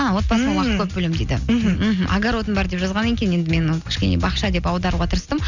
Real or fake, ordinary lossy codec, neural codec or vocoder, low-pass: real; none; none; 7.2 kHz